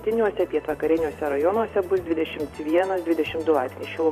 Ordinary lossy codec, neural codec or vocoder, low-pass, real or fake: MP3, 96 kbps; vocoder, 44.1 kHz, 128 mel bands every 512 samples, BigVGAN v2; 14.4 kHz; fake